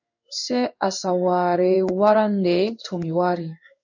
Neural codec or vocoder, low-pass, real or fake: codec, 16 kHz in and 24 kHz out, 1 kbps, XY-Tokenizer; 7.2 kHz; fake